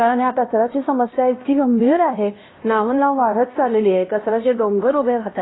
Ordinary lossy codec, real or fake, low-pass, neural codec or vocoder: AAC, 16 kbps; fake; 7.2 kHz; codec, 16 kHz, 1 kbps, X-Codec, HuBERT features, trained on LibriSpeech